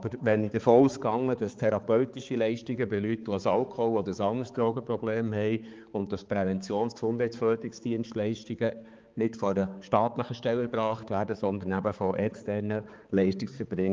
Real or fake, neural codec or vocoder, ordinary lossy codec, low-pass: fake; codec, 16 kHz, 4 kbps, X-Codec, HuBERT features, trained on balanced general audio; Opus, 24 kbps; 7.2 kHz